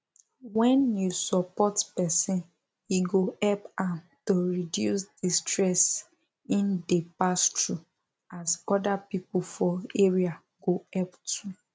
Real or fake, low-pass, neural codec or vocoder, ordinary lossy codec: real; none; none; none